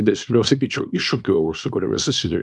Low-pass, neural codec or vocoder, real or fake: 10.8 kHz; codec, 24 kHz, 0.9 kbps, WavTokenizer, small release; fake